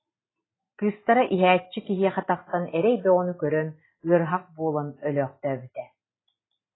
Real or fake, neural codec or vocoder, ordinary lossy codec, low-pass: real; none; AAC, 16 kbps; 7.2 kHz